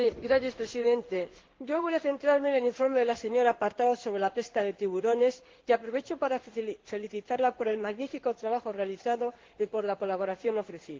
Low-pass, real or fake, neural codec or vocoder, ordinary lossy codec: 7.2 kHz; fake; codec, 16 kHz in and 24 kHz out, 1 kbps, XY-Tokenizer; Opus, 16 kbps